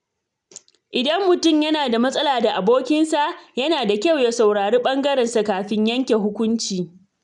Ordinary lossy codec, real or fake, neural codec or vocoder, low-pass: none; real; none; 10.8 kHz